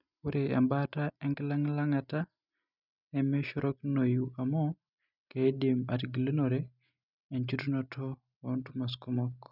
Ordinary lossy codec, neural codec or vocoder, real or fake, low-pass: none; none; real; 5.4 kHz